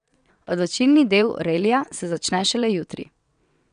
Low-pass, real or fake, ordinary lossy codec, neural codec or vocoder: 9.9 kHz; fake; none; vocoder, 22.05 kHz, 80 mel bands, WaveNeXt